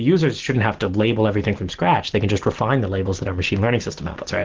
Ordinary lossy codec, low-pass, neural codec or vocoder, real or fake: Opus, 16 kbps; 7.2 kHz; none; real